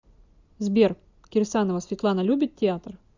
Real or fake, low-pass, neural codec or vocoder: real; 7.2 kHz; none